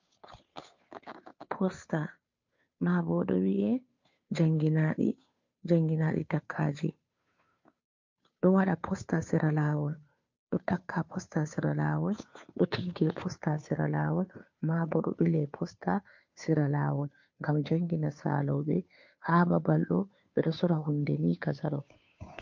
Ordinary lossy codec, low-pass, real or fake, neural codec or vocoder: MP3, 48 kbps; 7.2 kHz; fake; codec, 16 kHz, 2 kbps, FunCodec, trained on Chinese and English, 25 frames a second